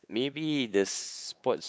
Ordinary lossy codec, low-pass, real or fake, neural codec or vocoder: none; none; fake; codec, 16 kHz, 4 kbps, X-Codec, WavLM features, trained on Multilingual LibriSpeech